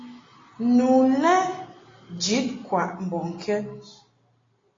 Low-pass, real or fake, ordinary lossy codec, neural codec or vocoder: 7.2 kHz; real; AAC, 32 kbps; none